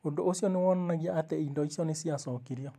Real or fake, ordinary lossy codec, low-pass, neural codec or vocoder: fake; AAC, 96 kbps; 14.4 kHz; vocoder, 44.1 kHz, 128 mel bands every 256 samples, BigVGAN v2